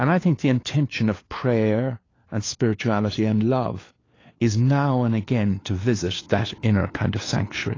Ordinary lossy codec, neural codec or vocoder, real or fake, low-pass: AAC, 32 kbps; codec, 16 kHz, 4 kbps, FunCodec, trained on LibriTTS, 50 frames a second; fake; 7.2 kHz